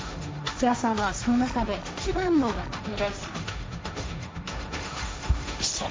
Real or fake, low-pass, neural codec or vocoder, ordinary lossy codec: fake; none; codec, 16 kHz, 1.1 kbps, Voila-Tokenizer; none